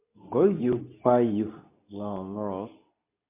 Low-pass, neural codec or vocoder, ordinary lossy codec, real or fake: 3.6 kHz; codec, 24 kHz, 0.9 kbps, WavTokenizer, medium speech release version 2; MP3, 24 kbps; fake